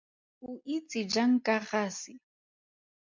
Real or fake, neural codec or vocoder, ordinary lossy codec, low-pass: real; none; MP3, 64 kbps; 7.2 kHz